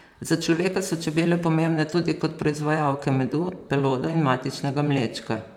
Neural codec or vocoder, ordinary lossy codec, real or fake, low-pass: vocoder, 44.1 kHz, 128 mel bands, Pupu-Vocoder; none; fake; 19.8 kHz